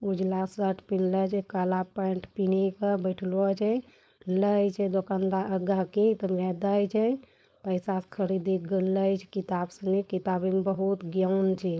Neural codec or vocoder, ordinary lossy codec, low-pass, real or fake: codec, 16 kHz, 4.8 kbps, FACodec; none; none; fake